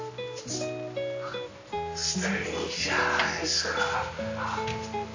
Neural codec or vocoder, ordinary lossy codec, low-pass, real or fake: none; AAC, 48 kbps; 7.2 kHz; real